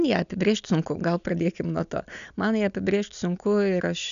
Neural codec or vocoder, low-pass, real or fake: none; 7.2 kHz; real